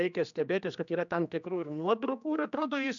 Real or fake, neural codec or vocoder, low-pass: fake; codec, 16 kHz, 2 kbps, X-Codec, HuBERT features, trained on general audio; 7.2 kHz